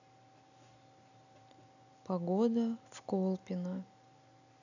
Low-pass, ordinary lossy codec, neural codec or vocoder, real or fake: 7.2 kHz; none; none; real